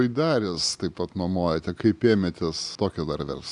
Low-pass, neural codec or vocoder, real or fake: 10.8 kHz; none; real